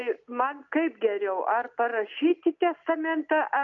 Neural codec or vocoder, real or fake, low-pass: none; real; 7.2 kHz